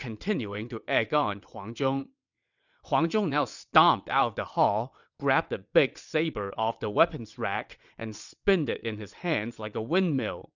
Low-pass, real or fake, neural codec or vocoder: 7.2 kHz; fake; vocoder, 22.05 kHz, 80 mel bands, WaveNeXt